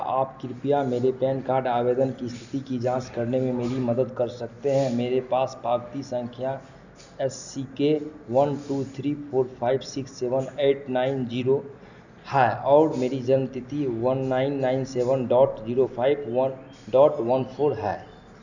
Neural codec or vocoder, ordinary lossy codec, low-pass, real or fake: none; none; 7.2 kHz; real